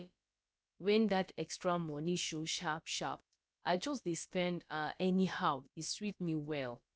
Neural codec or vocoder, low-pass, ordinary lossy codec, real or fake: codec, 16 kHz, about 1 kbps, DyCAST, with the encoder's durations; none; none; fake